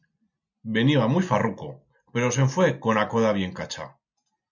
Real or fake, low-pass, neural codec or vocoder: real; 7.2 kHz; none